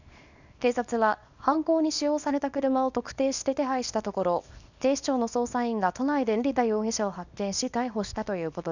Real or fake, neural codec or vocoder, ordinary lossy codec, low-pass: fake; codec, 24 kHz, 0.9 kbps, WavTokenizer, small release; none; 7.2 kHz